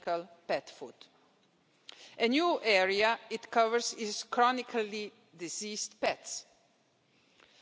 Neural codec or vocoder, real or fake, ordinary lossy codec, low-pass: none; real; none; none